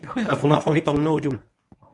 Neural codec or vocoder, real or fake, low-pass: codec, 24 kHz, 0.9 kbps, WavTokenizer, medium speech release version 1; fake; 10.8 kHz